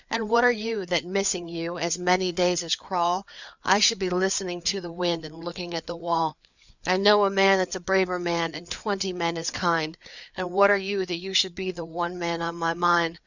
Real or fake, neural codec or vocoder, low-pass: fake; codec, 16 kHz, 4 kbps, FreqCodec, larger model; 7.2 kHz